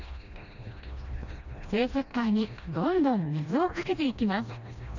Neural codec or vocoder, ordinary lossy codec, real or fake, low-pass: codec, 16 kHz, 1 kbps, FreqCodec, smaller model; none; fake; 7.2 kHz